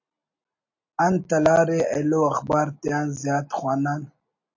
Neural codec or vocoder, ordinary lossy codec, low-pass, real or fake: none; AAC, 48 kbps; 7.2 kHz; real